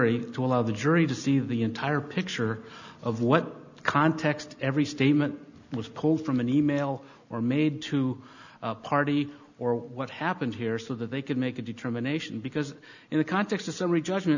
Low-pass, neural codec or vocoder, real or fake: 7.2 kHz; none; real